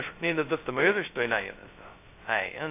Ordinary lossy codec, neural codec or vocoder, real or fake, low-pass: AAC, 24 kbps; codec, 16 kHz, 0.2 kbps, FocalCodec; fake; 3.6 kHz